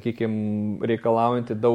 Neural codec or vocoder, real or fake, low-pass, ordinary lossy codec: none; real; 19.8 kHz; MP3, 64 kbps